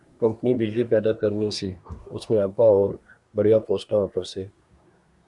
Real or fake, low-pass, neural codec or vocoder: fake; 10.8 kHz; codec, 24 kHz, 1 kbps, SNAC